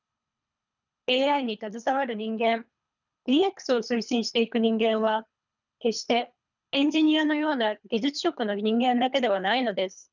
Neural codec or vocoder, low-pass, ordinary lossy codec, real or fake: codec, 24 kHz, 3 kbps, HILCodec; 7.2 kHz; none; fake